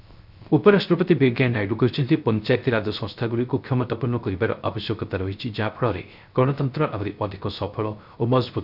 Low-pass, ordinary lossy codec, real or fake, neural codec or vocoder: 5.4 kHz; none; fake; codec, 16 kHz, 0.3 kbps, FocalCodec